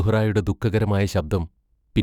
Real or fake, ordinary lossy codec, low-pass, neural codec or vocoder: fake; none; 19.8 kHz; autoencoder, 48 kHz, 128 numbers a frame, DAC-VAE, trained on Japanese speech